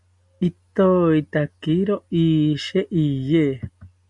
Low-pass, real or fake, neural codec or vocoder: 10.8 kHz; real; none